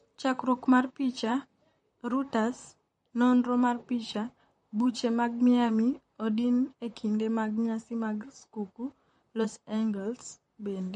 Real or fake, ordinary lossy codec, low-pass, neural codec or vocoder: fake; MP3, 48 kbps; 19.8 kHz; vocoder, 44.1 kHz, 128 mel bands, Pupu-Vocoder